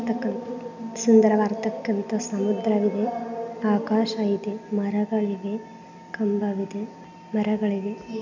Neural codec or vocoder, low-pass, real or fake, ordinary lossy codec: none; 7.2 kHz; real; none